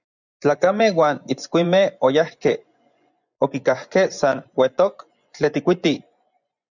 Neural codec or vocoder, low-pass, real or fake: vocoder, 24 kHz, 100 mel bands, Vocos; 7.2 kHz; fake